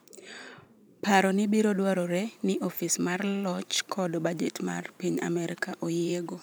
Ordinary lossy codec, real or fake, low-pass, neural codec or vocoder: none; fake; none; vocoder, 44.1 kHz, 128 mel bands every 512 samples, BigVGAN v2